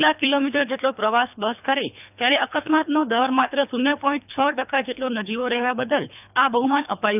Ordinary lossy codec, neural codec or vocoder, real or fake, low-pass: none; codec, 24 kHz, 3 kbps, HILCodec; fake; 3.6 kHz